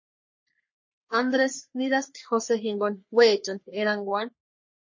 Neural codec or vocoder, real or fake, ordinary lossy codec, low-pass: codec, 44.1 kHz, 3.4 kbps, Pupu-Codec; fake; MP3, 32 kbps; 7.2 kHz